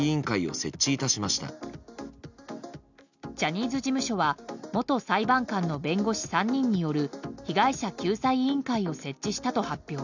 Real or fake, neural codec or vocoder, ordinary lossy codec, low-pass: real; none; none; 7.2 kHz